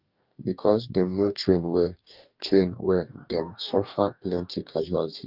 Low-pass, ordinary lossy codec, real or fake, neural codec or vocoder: 5.4 kHz; Opus, 24 kbps; fake; codec, 44.1 kHz, 2.6 kbps, DAC